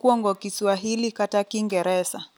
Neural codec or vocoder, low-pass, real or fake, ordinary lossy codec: none; none; real; none